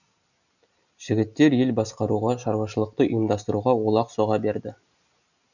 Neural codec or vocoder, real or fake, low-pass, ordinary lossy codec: none; real; 7.2 kHz; none